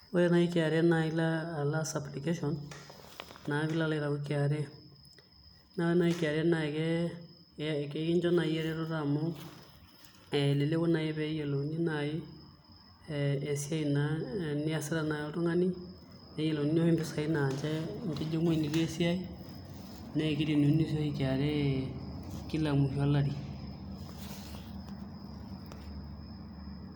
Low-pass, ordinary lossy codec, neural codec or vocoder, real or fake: none; none; none; real